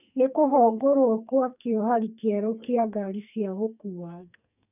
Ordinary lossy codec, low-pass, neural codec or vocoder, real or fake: none; 3.6 kHz; codec, 44.1 kHz, 2.6 kbps, SNAC; fake